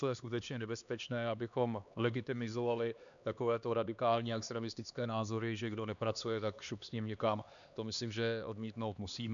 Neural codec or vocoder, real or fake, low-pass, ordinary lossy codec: codec, 16 kHz, 2 kbps, X-Codec, HuBERT features, trained on LibriSpeech; fake; 7.2 kHz; MP3, 96 kbps